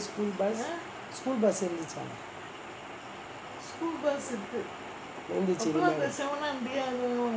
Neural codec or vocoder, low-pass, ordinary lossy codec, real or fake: none; none; none; real